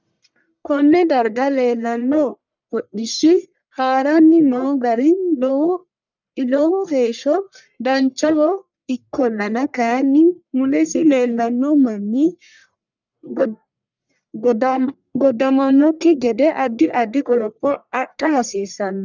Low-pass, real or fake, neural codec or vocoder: 7.2 kHz; fake; codec, 44.1 kHz, 1.7 kbps, Pupu-Codec